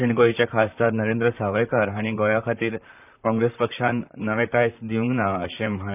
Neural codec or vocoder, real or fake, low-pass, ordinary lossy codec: vocoder, 44.1 kHz, 128 mel bands, Pupu-Vocoder; fake; 3.6 kHz; none